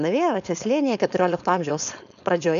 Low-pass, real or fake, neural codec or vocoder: 7.2 kHz; fake; codec, 16 kHz, 4.8 kbps, FACodec